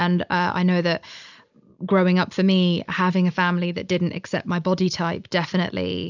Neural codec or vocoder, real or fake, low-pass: none; real; 7.2 kHz